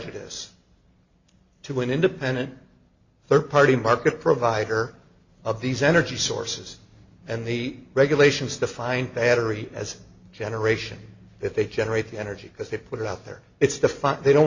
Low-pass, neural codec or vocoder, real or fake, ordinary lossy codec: 7.2 kHz; none; real; Opus, 64 kbps